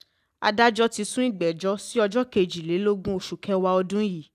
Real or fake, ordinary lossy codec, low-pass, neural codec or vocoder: real; none; 14.4 kHz; none